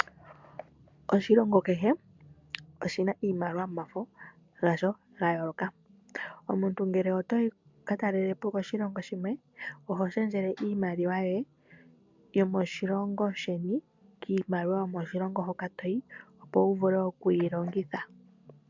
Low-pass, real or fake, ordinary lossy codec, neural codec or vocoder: 7.2 kHz; real; MP3, 64 kbps; none